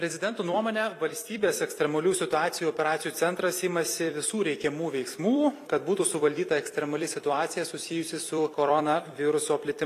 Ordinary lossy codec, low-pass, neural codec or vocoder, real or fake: AAC, 64 kbps; 14.4 kHz; vocoder, 44.1 kHz, 128 mel bands every 256 samples, BigVGAN v2; fake